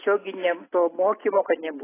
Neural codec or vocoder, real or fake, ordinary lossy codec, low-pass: none; real; AAC, 16 kbps; 3.6 kHz